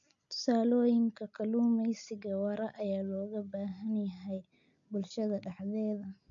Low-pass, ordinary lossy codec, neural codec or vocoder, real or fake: 7.2 kHz; none; none; real